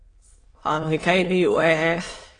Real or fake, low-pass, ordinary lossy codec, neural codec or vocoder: fake; 9.9 kHz; AAC, 32 kbps; autoencoder, 22.05 kHz, a latent of 192 numbers a frame, VITS, trained on many speakers